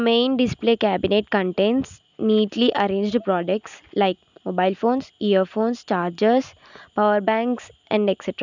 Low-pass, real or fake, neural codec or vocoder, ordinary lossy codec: 7.2 kHz; real; none; none